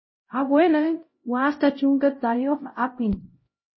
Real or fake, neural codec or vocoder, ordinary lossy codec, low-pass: fake; codec, 16 kHz, 0.5 kbps, X-Codec, HuBERT features, trained on LibriSpeech; MP3, 24 kbps; 7.2 kHz